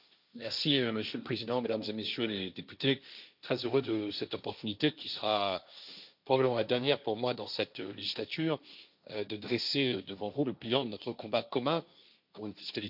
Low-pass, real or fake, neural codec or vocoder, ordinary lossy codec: 5.4 kHz; fake; codec, 16 kHz, 1.1 kbps, Voila-Tokenizer; none